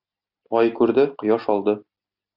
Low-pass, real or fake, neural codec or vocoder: 5.4 kHz; real; none